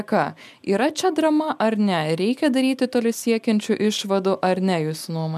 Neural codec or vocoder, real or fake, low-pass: none; real; 14.4 kHz